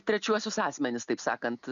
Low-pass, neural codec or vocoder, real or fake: 7.2 kHz; none; real